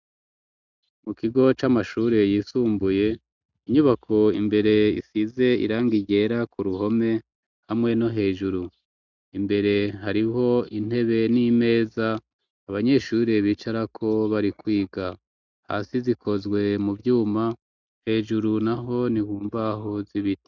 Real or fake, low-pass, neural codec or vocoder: real; 7.2 kHz; none